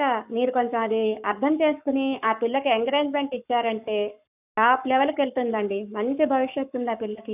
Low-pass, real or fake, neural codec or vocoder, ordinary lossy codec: 3.6 kHz; fake; codec, 44.1 kHz, 7.8 kbps, Pupu-Codec; none